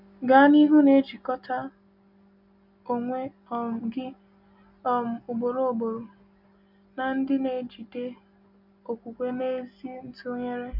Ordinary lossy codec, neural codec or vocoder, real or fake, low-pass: none; none; real; 5.4 kHz